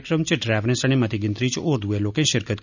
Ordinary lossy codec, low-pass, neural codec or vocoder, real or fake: none; 7.2 kHz; none; real